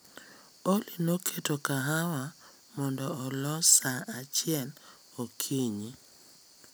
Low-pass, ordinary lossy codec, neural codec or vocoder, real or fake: none; none; none; real